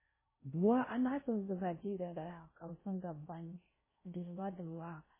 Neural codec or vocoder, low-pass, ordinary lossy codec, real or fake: codec, 16 kHz in and 24 kHz out, 0.6 kbps, FocalCodec, streaming, 4096 codes; 3.6 kHz; MP3, 16 kbps; fake